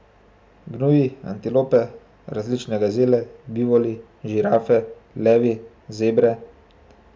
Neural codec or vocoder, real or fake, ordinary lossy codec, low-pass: none; real; none; none